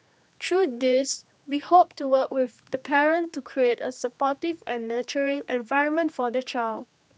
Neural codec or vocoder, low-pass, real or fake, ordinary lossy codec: codec, 16 kHz, 2 kbps, X-Codec, HuBERT features, trained on general audio; none; fake; none